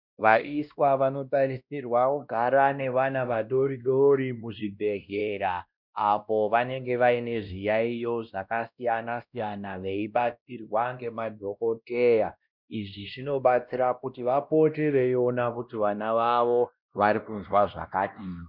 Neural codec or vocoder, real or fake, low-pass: codec, 16 kHz, 1 kbps, X-Codec, WavLM features, trained on Multilingual LibriSpeech; fake; 5.4 kHz